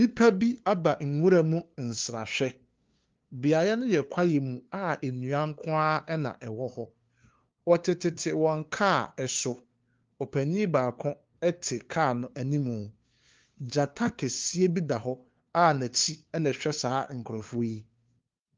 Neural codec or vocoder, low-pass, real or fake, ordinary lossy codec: codec, 16 kHz, 2 kbps, FunCodec, trained on LibriTTS, 25 frames a second; 7.2 kHz; fake; Opus, 32 kbps